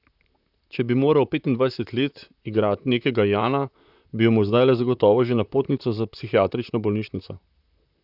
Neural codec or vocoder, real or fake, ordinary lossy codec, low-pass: vocoder, 44.1 kHz, 128 mel bands, Pupu-Vocoder; fake; none; 5.4 kHz